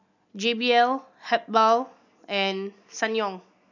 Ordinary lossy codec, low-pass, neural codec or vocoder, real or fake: none; 7.2 kHz; none; real